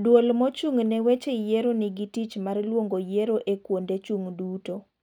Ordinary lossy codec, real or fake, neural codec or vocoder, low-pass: none; real; none; 19.8 kHz